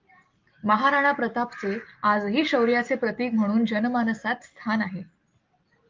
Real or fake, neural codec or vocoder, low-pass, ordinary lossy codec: real; none; 7.2 kHz; Opus, 32 kbps